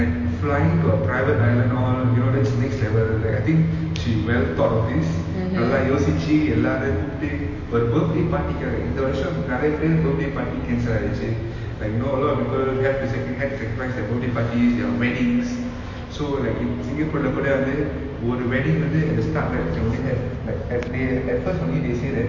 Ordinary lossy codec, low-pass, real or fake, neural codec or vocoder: MP3, 32 kbps; 7.2 kHz; real; none